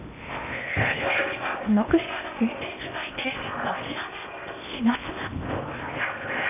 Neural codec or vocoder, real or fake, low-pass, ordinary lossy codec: codec, 16 kHz in and 24 kHz out, 0.8 kbps, FocalCodec, streaming, 65536 codes; fake; 3.6 kHz; none